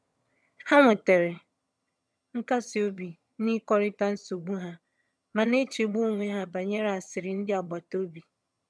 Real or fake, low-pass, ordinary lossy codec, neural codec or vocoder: fake; none; none; vocoder, 22.05 kHz, 80 mel bands, HiFi-GAN